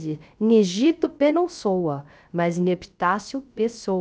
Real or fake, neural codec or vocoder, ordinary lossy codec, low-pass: fake; codec, 16 kHz, 0.3 kbps, FocalCodec; none; none